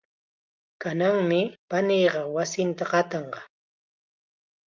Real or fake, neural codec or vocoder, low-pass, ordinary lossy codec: real; none; 7.2 kHz; Opus, 24 kbps